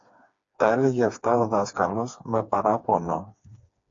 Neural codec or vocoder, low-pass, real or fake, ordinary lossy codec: codec, 16 kHz, 4 kbps, FreqCodec, smaller model; 7.2 kHz; fake; AAC, 48 kbps